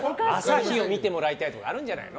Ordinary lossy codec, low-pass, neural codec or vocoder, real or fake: none; none; none; real